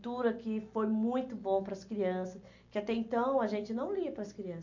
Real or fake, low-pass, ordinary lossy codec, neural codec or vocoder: real; 7.2 kHz; MP3, 64 kbps; none